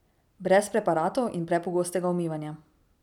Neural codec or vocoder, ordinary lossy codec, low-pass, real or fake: none; none; 19.8 kHz; real